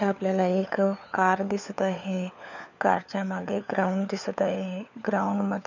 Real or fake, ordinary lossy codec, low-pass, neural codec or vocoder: fake; none; 7.2 kHz; codec, 16 kHz, 4 kbps, FunCodec, trained on LibriTTS, 50 frames a second